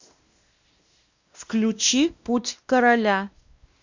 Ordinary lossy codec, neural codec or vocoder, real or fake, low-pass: Opus, 64 kbps; codec, 16 kHz, 1 kbps, X-Codec, WavLM features, trained on Multilingual LibriSpeech; fake; 7.2 kHz